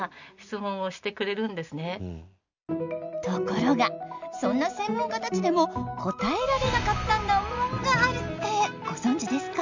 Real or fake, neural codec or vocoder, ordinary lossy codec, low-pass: real; none; none; 7.2 kHz